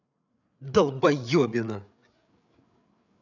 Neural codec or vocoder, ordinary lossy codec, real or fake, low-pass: codec, 16 kHz, 8 kbps, FreqCodec, larger model; none; fake; 7.2 kHz